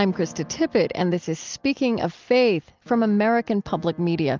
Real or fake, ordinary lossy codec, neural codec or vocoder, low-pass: real; Opus, 24 kbps; none; 7.2 kHz